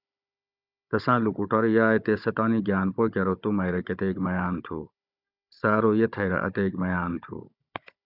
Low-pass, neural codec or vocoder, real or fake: 5.4 kHz; codec, 16 kHz, 16 kbps, FunCodec, trained on Chinese and English, 50 frames a second; fake